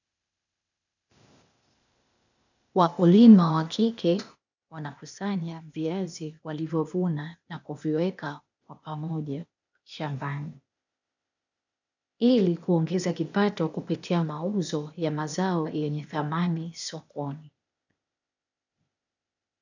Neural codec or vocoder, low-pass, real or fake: codec, 16 kHz, 0.8 kbps, ZipCodec; 7.2 kHz; fake